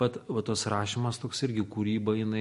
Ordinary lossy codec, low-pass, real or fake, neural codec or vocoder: MP3, 48 kbps; 14.4 kHz; real; none